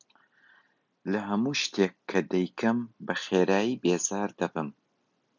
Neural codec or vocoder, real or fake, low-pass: none; real; 7.2 kHz